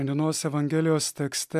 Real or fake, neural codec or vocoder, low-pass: real; none; 14.4 kHz